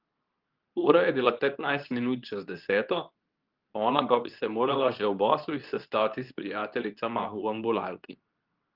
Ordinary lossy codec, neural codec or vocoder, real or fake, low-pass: Opus, 24 kbps; codec, 24 kHz, 0.9 kbps, WavTokenizer, medium speech release version 2; fake; 5.4 kHz